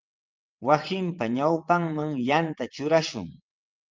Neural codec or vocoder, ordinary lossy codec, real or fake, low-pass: vocoder, 22.05 kHz, 80 mel bands, WaveNeXt; Opus, 24 kbps; fake; 7.2 kHz